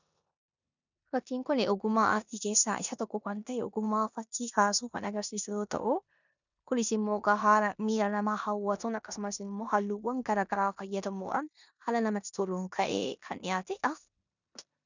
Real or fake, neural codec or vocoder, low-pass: fake; codec, 16 kHz in and 24 kHz out, 0.9 kbps, LongCat-Audio-Codec, four codebook decoder; 7.2 kHz